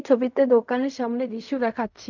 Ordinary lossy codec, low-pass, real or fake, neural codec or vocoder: none; 7.2 kHz; fake; codec, 16 kHz in and 24 kHz out, 0.4 kbps, LongCat-Audio-Codec, fine tuned four codebook decoder